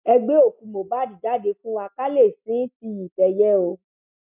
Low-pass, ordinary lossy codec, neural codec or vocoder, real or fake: 3.6 kHz; none; none; real